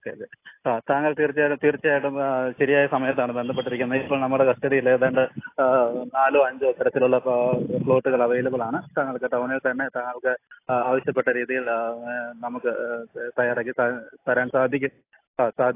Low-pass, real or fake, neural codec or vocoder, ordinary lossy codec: 3.6 kHz; real; none; AAC, 24 kbps